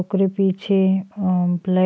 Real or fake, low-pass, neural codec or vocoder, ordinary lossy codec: real; none; none; none